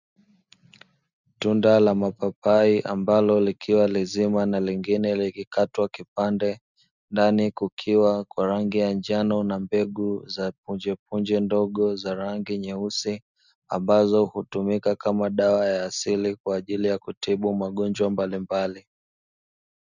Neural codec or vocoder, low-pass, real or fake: none; 7.2 kHz; real